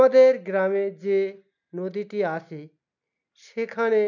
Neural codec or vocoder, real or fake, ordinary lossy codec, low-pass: none; real; none; 7.2 kHz